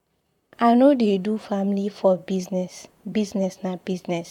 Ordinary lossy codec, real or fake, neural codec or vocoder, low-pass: none; fake; vocoder, 44.1 kHz, 128 mel bands, Pupu-Vocoder; 19.8 kHz